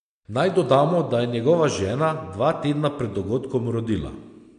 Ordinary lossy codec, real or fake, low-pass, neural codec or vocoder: MP3, 64 kbps; real; 9.9 kHz; none